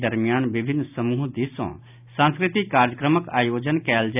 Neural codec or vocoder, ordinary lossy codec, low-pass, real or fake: none; none; 3.6 kHz; real